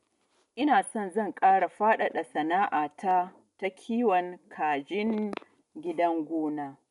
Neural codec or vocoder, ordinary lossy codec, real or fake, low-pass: none; none; real; 10.8 kHz